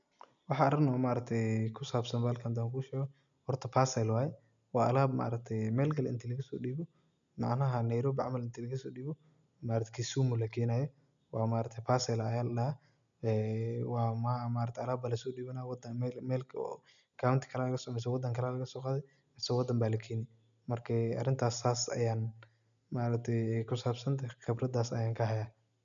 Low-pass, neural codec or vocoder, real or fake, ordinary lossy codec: 7.2 kHz; none; real; none